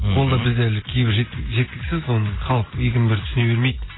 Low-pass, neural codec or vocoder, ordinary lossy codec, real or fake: 7.2 kHz; none; AAC, 16 kbps; real